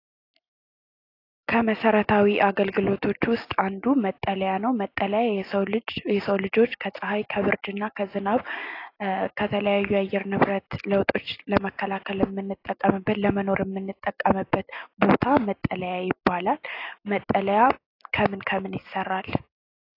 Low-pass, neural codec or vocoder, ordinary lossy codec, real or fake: 5.4 kHz; none; AAC, 32 kbps; real